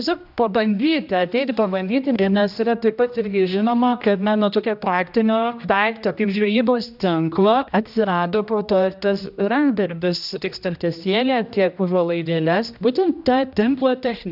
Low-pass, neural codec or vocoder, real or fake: 5.4 kHz; codec, 16 kHz, 1 kbps, X-Codec, HuBERT features, trained on general audio; fake